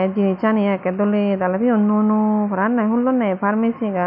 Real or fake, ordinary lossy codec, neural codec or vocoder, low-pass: real; none; none; 5.4 kHz